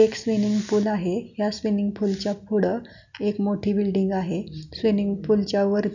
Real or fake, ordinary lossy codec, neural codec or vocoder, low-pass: real; none; none; 7.2 kHz